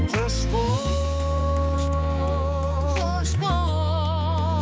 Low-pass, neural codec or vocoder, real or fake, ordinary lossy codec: none; codec, 16 kHz, 4 kbps, X-Codec, HuBERT features, trained on balanced general audio; fake; none